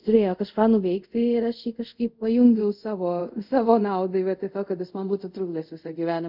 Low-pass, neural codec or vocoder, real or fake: 5.4 kHz; codec, 24 kHz, 0.5 kbps, DualCodec; fake